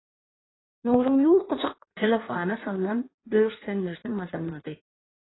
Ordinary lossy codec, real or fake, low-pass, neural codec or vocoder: AAC, 16 kbps; fake; 7.2 kHz; codec, 16 kHz in and 24 kHz out, 1.1 kbps, FireRedTTS-2 codec